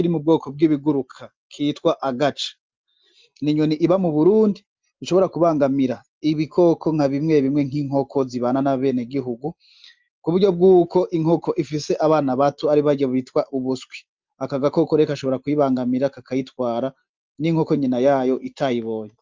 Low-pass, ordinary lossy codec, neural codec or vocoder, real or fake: 7.2 kHz; Opus, 32 kbps; none; real